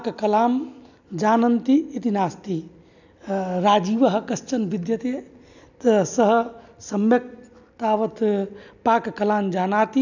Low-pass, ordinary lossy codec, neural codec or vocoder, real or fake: 7.2 kHz; none; none; real